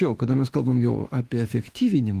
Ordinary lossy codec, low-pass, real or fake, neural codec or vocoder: Opus, 24 kbps; 14.4 kHz; fake; autoencoder, 48 kHz, 32 numbers a frame, DAC-VAE, trained on Japanese speech